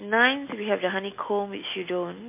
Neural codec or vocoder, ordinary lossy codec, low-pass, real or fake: none; MP3, 24 kbps; 3.6 kHz; real